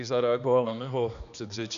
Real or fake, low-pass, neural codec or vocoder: fake; 7.2 kHz; codec, 16 kHz, 2 kbps, X-Codec, HuBERT features, trained on balanced general audio